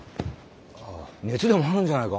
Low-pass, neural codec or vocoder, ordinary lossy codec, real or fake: none; none; none; real